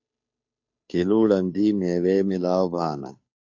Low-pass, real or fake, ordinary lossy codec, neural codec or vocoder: 7.2 kHz; fake; AAC, 48 kbps; codec, 16 kHz, 2 kbps, FunCodec, trained on Chinese and English, 25 frames a second